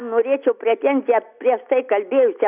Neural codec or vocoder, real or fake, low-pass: none; real; 3.6 kHz